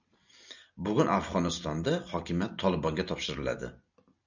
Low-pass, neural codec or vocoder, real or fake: 7.2 kHz; none; real